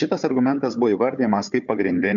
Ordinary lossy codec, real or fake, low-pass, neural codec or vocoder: MP3, 96 kbps; fake; 7.2 kHz; codec, 16 kHz, 4 kbps, FunCodec, trained on Chinese and English, 50 frames a second